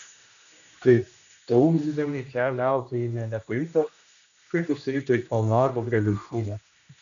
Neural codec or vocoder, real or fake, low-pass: codec, 16 kHz, 1 kbps, X-Codec, HuBERT features, trained on general audio; fake; 7.2 kHz